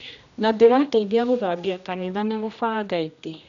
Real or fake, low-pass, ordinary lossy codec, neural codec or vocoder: fake; 7.2 kHz; none; codec, 16 kHz, 1 kbps, X-Codec, HuBERT features, trained on general audio